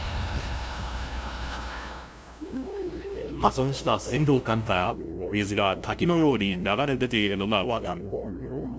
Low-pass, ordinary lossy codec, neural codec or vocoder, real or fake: none; none; codec, 16 kHz, 0.5 kbps, FunCodec, trained on LibriTTS, 25 frames a second; fake